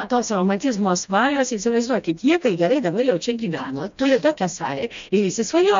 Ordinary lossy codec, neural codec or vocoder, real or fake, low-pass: MP3, 64 kbps; codec, 16 kHz, 1 kbps, FreqCodec, smaller model; fake; 7.2 kHz